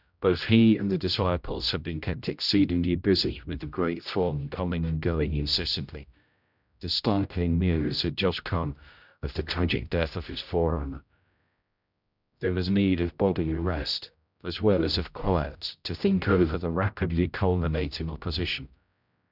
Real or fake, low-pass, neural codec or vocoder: fake; 5.4 kHz; codec, 16 kHz, 0.5 kbps, X-Codec, HuBERT features, trained on general audio